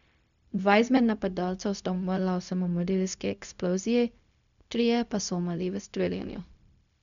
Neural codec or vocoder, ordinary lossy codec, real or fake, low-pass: codec, 16 kHz, 0.4 kbps, LongCat-Audio-Codec; none; fake; 7.2 kHz